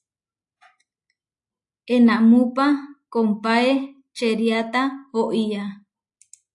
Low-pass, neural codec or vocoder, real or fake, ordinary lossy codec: 10.8 kHz; none; real; AAC, 64 kbps